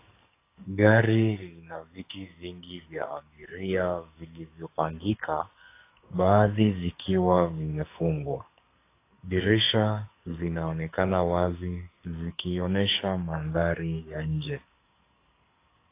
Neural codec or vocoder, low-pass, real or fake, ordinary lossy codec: codec, 44.1 kHz, 2.6 kbps, SNAC; 3.6 kHz; fake; AAC, 24 kbps